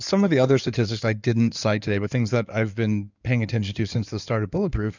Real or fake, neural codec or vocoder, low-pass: fake; codec, 16 kHz in and 24 kHz out, 2.2 kbps, FireRedTTS-2 codec; 7.2 kHz